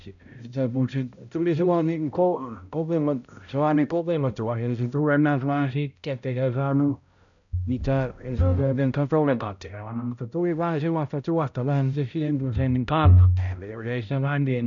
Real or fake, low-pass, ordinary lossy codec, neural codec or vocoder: fake; 7.2 kHz; none; codec, 16 kHz, 0.5 kbps, X-Codec, HuBERT features, trained on balanced general audio